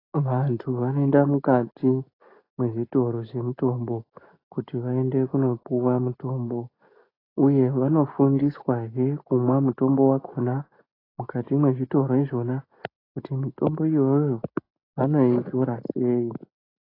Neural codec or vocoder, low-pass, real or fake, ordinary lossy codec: none; 5.4 kHz; real; AAC, 24 kbps